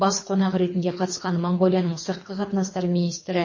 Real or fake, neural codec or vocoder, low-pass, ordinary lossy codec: fake; codec, 24 kHz, 3 kbps, HILCodec; 7.2 kHz; MP3, 32 kbps